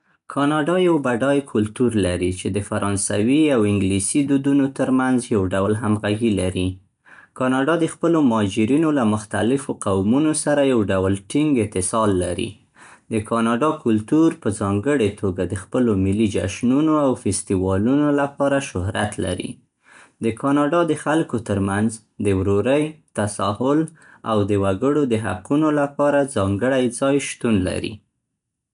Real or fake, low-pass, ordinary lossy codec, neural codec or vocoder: real; 10.8 kHz; none; none